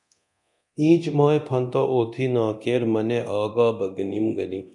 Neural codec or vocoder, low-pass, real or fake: codec, 24 kHz, 0.9 kbps, DualCodec; 10.8 kHz; fake